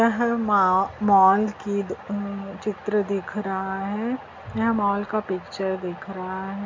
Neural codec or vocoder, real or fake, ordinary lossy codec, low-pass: none; real; MP3, 64 kbps; 7.2 kHz